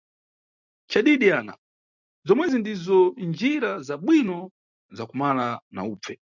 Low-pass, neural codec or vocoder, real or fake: 7.2 kHz; none; real